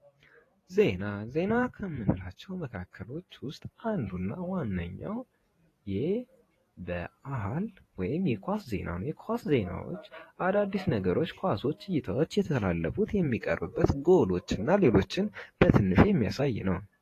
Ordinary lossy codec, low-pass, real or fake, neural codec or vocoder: AAC, 48 kbps; 14.4 kHz; fake; vocoder, 48 kHz, 128 mel bands, Vocos